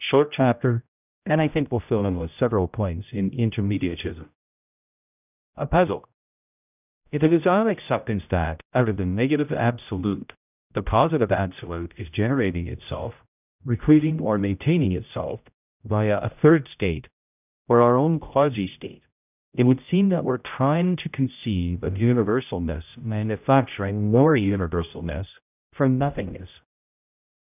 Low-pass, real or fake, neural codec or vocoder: 3.6 kHz; fake; codec, 16 kHz, 0.5 kbps, X-Codec, HuBERT features, trained on general audio